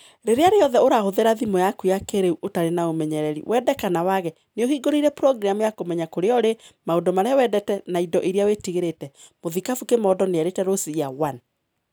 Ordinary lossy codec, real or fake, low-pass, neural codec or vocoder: none; real; none; none